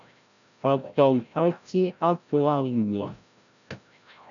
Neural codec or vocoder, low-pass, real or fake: codec, 16 kHz, 0.5 kbps, FreqCodec, larger model; 7.2 kHz; fake